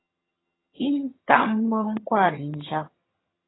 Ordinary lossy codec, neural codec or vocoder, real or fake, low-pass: AAC, 16 kbps; vocoder, 22.05 kHz, 80 mel bands, HiFi-GAN; fake; 7.2 kHz